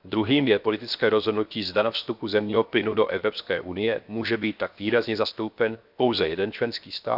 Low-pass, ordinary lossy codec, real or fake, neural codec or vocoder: 5.4 kHz; none; fake; codec, 16 kHz, 0.7 kbps, FocalCodec